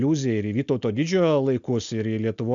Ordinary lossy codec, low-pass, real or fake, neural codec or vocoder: MP3, 64 kbps; 7.2 kHz; real; none